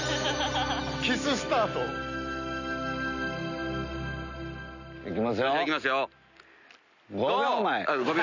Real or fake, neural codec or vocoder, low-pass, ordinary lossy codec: real; none; 7.2 kHz; none